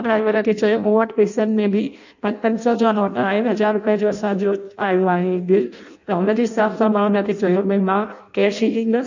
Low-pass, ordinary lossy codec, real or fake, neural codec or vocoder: 7.2 kHz; none; fake; codec, 16 kHz in and 24 kHz out, 0.6 kbps, FireRedTTS-2 codec